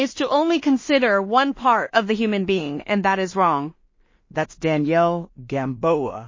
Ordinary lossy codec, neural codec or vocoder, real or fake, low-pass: MP3, 32 kbps; codec, 16 kHz in and 24 kHz out, 0.4 kbps, LongCat-Audio-Codec, two codebook decoder; fake; 7.2 kHz